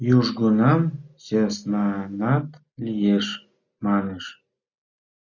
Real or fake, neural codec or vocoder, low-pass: real; none; 7.2 kHz